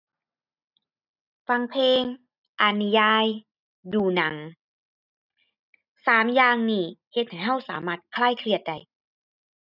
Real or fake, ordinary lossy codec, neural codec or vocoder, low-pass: real; none; none; 5.4 kHz